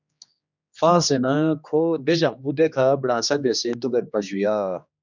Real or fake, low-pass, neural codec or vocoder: fake; 7.2 kHz; codec, 16 kHz, 2 kbps, X-Codec, HuBERT features, trained on general audio